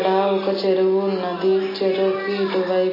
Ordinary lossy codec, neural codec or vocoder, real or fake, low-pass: MP3, 24 kbps; none; real; 5.4 kHz